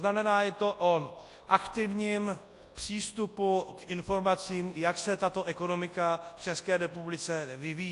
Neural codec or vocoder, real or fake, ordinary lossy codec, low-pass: codec, 24 kHz, 0.9 kbps, WavTokenizer, large speech release; fake; AAC, 48 kbps; 10.8 kHz